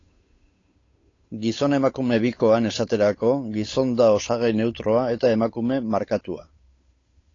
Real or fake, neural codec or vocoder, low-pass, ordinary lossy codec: fake; codec, 16 kHz, 8 kbps, FunCodec, trained on Chinese and English, 25 frames a second; 7.2 kHz; AAC, 32 kbps